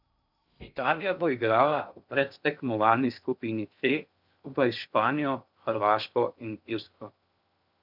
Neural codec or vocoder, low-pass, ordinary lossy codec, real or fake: codec, 16 kHz in and 24 kHz out, 0.6 kbps, FocalCodec, streaming, 2048 codes; 5.4 kHz; none; fake